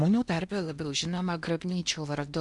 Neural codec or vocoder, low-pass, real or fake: codec, 16 kHz in and 24 kHz out, 0.8 kbps, FocalCodec, streaming, 65536 codes; 10.8 kHz; fake